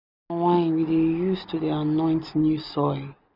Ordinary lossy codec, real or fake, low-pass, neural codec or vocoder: none; real; 5.4 kHz; none